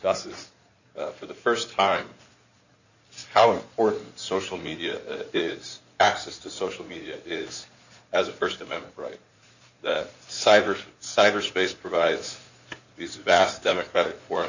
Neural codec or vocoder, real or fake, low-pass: codec, 16 kHz in and 24 kHz out, 2.2 kbps, FireRedTTS-2 codec; fake; 7.2 kHz